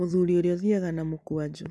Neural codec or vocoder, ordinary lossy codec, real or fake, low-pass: none; none; real; none